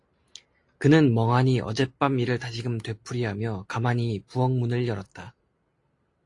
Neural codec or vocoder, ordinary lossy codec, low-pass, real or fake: none; AAC, 48 kbps; 10.8 kHz; real